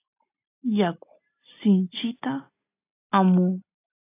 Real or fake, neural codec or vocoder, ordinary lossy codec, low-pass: real; none; AAC, 24 kbps; 3.6 kHz